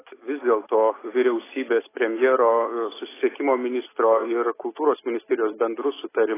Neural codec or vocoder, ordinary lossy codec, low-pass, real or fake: none; AAC, 16 kbps; 3.6 kHz; real